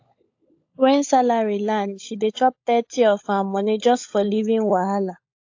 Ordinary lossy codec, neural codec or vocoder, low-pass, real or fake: AAC, 48 kbps; codec, 16 kHz, 16 kbps, FunCodec, trained on LibriTTS, 50 frames a second; 7.2 kHz; fake